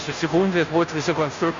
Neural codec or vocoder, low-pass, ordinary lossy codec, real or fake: codec, 16 kHz, 0.5 kbps, FunCodec, trained on Chinese and English, 25 frames a second; 7.2 kHz; MP3, 48 kbps; fake